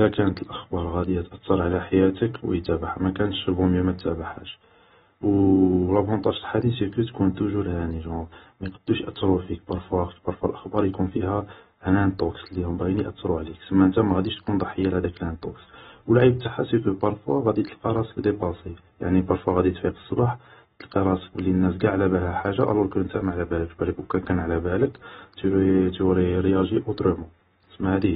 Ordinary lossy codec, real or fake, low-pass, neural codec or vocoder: AAC, 16 kbps; real; 19.8 kHz; none